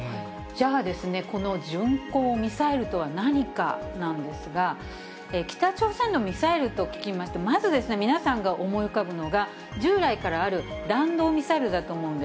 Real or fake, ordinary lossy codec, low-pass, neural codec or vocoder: real; none; none; none